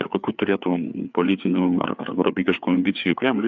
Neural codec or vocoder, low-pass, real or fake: codec, 16 kHz, 4 kbps, FreqCodec, larger model; 7.2 kHz; fake